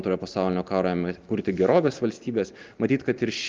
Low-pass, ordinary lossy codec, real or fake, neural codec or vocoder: 7.2 kHz; Opus, 32 kbps; real; none